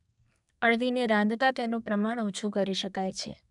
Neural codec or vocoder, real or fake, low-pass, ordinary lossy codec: codec, 32 kHz, 1.9 kbps, SNAC; fake; 10.8 kHz; none